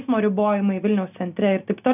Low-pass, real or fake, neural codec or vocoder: 3.6 kHz; real; none